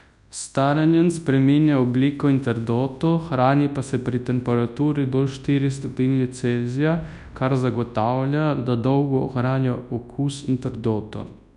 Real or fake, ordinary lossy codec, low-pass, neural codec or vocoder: fake; none; 10.8 kHz; codec, 24 kHz, 0.9 kbps, WavTokenizer, large speech release